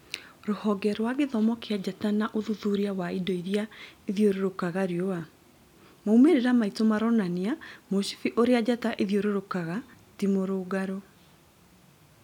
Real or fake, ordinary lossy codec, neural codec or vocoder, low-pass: real; none; none; 19.8 kHz